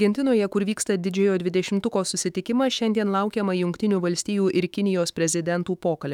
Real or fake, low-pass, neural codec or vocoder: fake; 19.8 kHz; autoencoder, 48 kHz, 128 numbers a frame, DAC-VAE, trained on Japanese speech